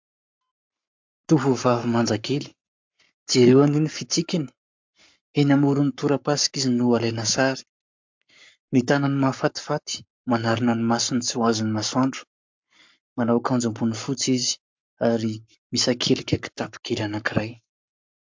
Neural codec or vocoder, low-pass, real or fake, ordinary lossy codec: codec, 44.1 kHz, 7.8 kbps, Pupu-Codec; 7.2 kHz; fake; MP3, 64 kbps